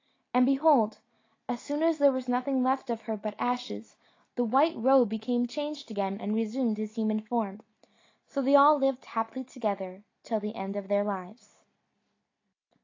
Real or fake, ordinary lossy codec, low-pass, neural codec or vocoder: real; AAC, 32 kbps; 7.2 kHz; none